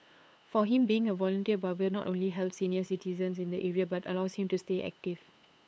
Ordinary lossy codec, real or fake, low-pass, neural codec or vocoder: none; fake; none; codec, 16 kHz, 8 kbps, FunCodec, trained on LibriTTS, 25 frames a second